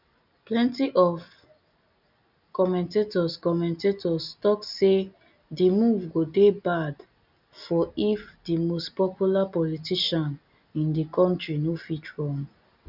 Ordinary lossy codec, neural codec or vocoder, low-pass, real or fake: none; none; 5.4 kHz; real